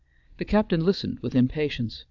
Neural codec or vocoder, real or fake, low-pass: vocoder, 22.05 kHz, 80 mel bands, Vocos; fake; 7.2 kHz